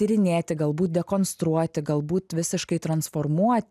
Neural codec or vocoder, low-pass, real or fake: none; 14.4 kHz; real